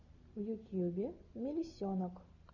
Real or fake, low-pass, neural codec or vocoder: real; 7.2 kHz; none